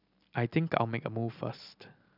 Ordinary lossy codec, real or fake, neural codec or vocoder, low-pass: none; real; none; 5.4 kHz